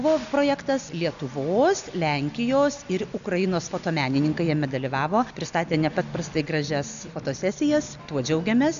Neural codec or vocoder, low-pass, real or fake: none; 7.2 kHz; real